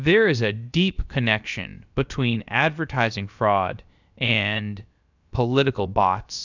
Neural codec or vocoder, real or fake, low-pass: codec, 16 kHz, about 1 kbps, DyCAST, with the encoder's durations; fake; 7.2 kHz